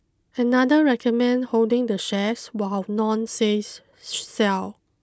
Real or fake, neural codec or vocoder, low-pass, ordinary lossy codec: real; none; none; none